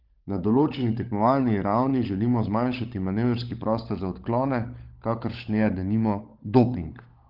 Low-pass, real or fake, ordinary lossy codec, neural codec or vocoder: 5.4 kHz; fake; Opus, 32 kbps; codec, 16 kHz, 16 kbps, FunCodec, trained on LibriTTS, 50 frames a second